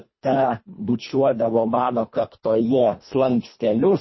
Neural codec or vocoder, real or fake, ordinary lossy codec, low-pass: codec, 24 kHz, 1.5 kbps, HILCodec; fake; MP3, 24 kbps; 7.2 kHz